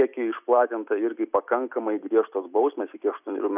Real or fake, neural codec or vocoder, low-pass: fake; vocoder, 44.1 kHz, 128 mel bands every 256 samples, BigVGAN v2; 3.6 kHz